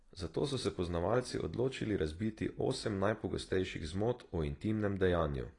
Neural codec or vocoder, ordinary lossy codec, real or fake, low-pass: none; AAC, 32 kbps; real; 10.8 kHz